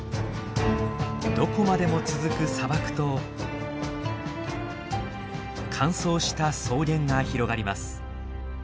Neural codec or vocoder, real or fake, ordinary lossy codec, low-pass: none; real; none; none